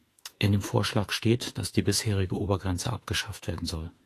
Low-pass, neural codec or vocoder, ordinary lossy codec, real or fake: 14.4 kHz; autoencoder, 48 kHz, 32 numbers a frame, DAC-VAE, trained on Japanese speech; AAC, 48 kbps; fake